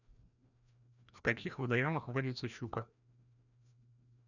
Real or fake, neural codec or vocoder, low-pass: fake; codec, 16 kHz, 1 kbps, FreqCodec, larger model; 7.2 kHz